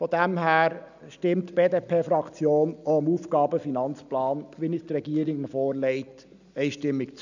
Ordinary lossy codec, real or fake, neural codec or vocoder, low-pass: none; real; none; 7.2 kHz